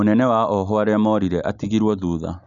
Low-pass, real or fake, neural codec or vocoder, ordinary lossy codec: 7.2 kHz; real; none; none